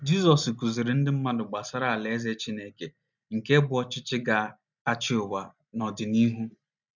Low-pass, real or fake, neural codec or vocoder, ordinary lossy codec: 7.2 kHz; real; none; none